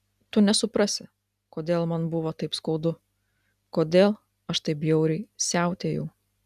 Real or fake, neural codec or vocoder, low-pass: real; none; 14.4 kHz